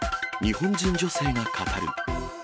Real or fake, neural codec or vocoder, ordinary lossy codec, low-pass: real; none; none; none